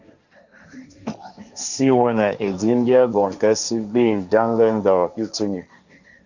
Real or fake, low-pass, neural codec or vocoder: fake; 7.2 kHz; codec, 16 kHz, 1.1 kbps, Voila-Tokenizer